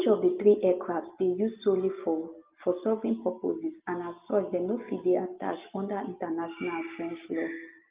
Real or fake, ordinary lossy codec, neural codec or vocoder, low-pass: real; Opus, 32 kbps; none; 3.6 kHz